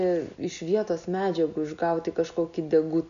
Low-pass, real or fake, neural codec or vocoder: 7.2 kHz; real; none